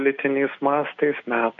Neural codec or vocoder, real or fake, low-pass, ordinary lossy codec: none; real; 7.2 kHz; AAC, 48 kbps